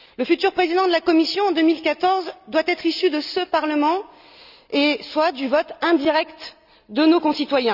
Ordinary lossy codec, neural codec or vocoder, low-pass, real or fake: none; none; 5.4 kHz; real